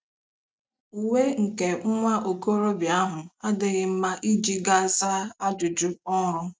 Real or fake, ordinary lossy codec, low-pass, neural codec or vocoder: real; none; none; none